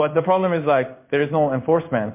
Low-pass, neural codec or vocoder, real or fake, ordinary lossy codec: 3.6 kHz; codec, 16 kHz in and 24 kHz out, 1 kbps, XY-Tokenizer; fake; MP3, 24 kbps